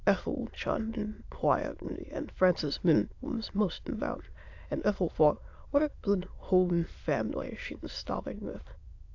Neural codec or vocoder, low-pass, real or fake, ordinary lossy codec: autoencoder, 22.05 kHz, a latent of 192 numbers a frame, VITS, trained on many speakers; 7.2 kHz; fake; AAC, 48 kbps